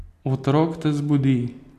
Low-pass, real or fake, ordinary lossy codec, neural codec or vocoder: 14.4 kHz; real; AAC, 64 kbps; none